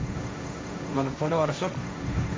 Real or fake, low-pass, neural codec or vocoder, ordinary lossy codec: fake; none; codec, 16 kHz, 1.1 kbps, Voila-Tokenizer; none